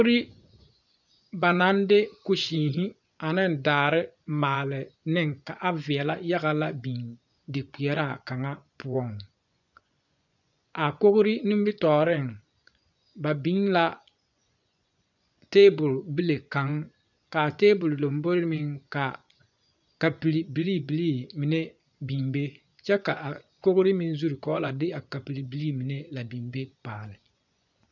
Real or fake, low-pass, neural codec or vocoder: fake; 7.2 kHz; vocoder, 24 kHz, 100 mel bands, Vocos